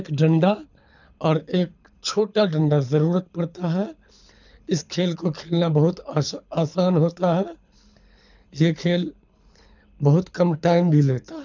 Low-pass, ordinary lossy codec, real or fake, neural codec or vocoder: 7.2 kHz; none; fake; codec, 24 kHz, 6 kbps, HILCodec